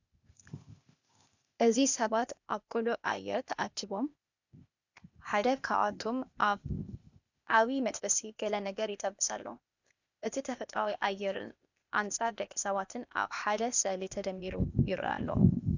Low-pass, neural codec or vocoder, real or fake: 7.2 kHz; codec, 16 kHz, 0.8 kbps, ZipCodec; fake